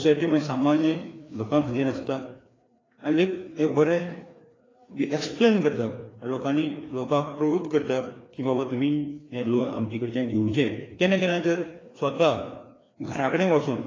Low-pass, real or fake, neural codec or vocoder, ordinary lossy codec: 7.2 kHz; fake; codec, 16 kHz, 2 kbps, FreqCodec, larger model; AAC, 32 kbps